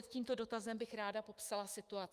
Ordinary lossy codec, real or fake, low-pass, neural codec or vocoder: Opus, 32 kbps; fake; 14.4 kHz; autoencoder, 48 kHz, 128 numbers a frame, DAC-VAE, trained on Japanese speech